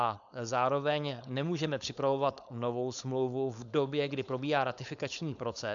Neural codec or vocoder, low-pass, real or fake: codec, 16 kHz, 4.8 kbps, FACodec; 7.2 kHz; fake